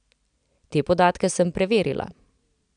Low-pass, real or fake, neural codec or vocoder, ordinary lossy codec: 9.9 kHz; real; none; none